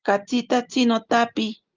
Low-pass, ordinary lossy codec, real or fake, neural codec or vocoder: 7.2 kHz; Opus, 24 kbps; real; none